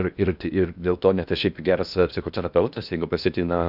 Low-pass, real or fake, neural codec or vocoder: 5.4 kHz; fake; codec, 16 kHz in and 24 kHz out, 0.8 kbps, FocalCodec, streaming, 65536 codes